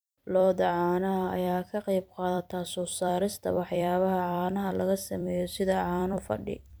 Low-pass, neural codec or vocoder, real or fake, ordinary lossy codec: none; vocoder, 44.1 kHz, 128 mel bands every 256 samples, BigVGAN v2; fake; none